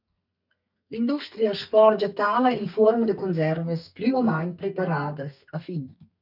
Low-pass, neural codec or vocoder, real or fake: 5.4 kHz; codec, 32 kHz, 1.9 kbps, SNAC; fake